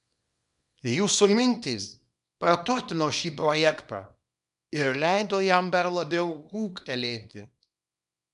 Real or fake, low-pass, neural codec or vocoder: fake; 10.8 kHz; codec, 24 kHz, 0.9 kbps, WavTokenizer, small release